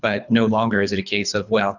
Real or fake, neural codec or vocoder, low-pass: fake; codec, 24 kHz, 3 kbps, HILCodec; 7.2 kHz